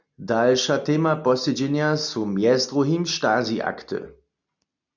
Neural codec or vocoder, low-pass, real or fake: none; 7.2 kHz; real